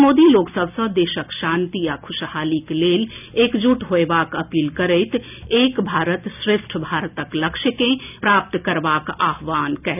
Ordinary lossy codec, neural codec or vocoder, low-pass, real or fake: none; none; 3.6 kHz; real